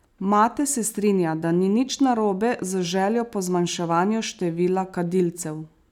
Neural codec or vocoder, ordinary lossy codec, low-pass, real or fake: none; none; 19.8 kHz; real